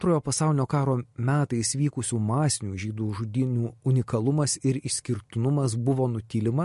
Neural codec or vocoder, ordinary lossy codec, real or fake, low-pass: none; MP3, 48 kbps; real; 14.4 kHz